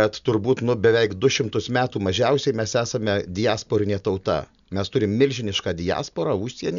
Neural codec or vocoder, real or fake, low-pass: none; real; 7.2 kHz